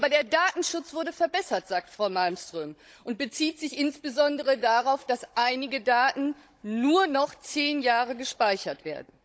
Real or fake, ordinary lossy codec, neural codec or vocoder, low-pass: fake; none; codec, 16 kHz, 16 kbps, FunCodec, trained on Chinese and English, 50 frames a second; none